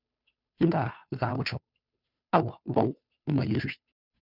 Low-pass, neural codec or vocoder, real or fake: 5.4 kHz; codec, 16 kHz, 2 kbps, FunCodec, trained on Chinese and English, 25 frames a second; fake